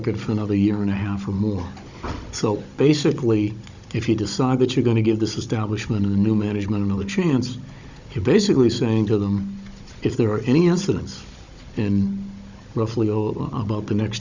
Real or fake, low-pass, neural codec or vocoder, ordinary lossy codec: fake; 7.2 kHz; codec, 16 kHz, 16 kbps, FunCodec, trained on Chinese and English, 50 frames a second; Opus, 64 kbps